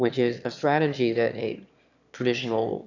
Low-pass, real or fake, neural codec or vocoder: 7.2 kHz; fake; autoencoder, 22.05 kHz, a latent of 192 numbers a frame, VITS, trained on one speaker